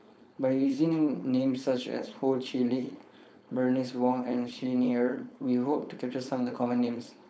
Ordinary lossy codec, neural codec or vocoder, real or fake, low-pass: none; codec, 16 kHz, 4.8 kbps, FACodec; fake; none